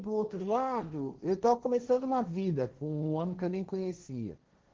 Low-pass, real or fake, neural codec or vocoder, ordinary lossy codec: 7.2 kHz; fake; codec, 16 kHz, 1.1 kbps, Voila-Tokenizer; Opus, 16 kbps